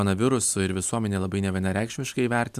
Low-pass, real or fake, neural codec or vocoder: 14.4 kHz; real; none